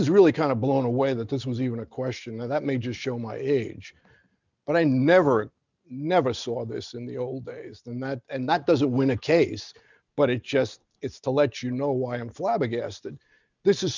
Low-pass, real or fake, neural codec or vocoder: 7.2 kHz; real; none